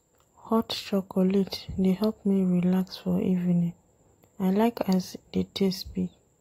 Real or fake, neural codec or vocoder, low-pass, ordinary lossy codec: real; none; 19.8 kHz; AAC, 48 kbps